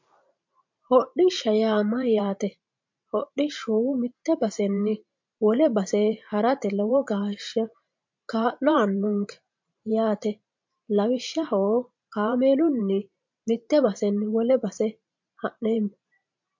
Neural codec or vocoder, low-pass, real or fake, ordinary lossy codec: vocoder, 44.1 kHz, 128 mel bands every 512 samples, BigVGAN v2; 7.2 kHz; fake; MP3, 48 kbps